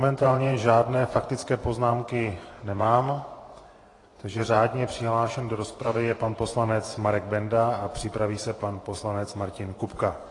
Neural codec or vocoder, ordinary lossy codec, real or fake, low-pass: vocoder, 24 kHz, 100 mel bands, Vocos; AAC, 32 kbps; fake; 10.8 kHz